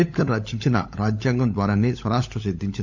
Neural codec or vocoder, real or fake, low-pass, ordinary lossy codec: codec, 16 kHz, 16 kbps, FunCodec, trained on LibriTTS, 50 frames a second; fake; 7.2 kHz; none